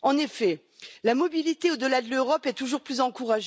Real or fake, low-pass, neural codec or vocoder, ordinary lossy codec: real; none; none; none